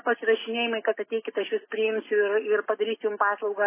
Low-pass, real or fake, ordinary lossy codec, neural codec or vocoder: 3.6 kHz; real; MP3, 16 kbps; none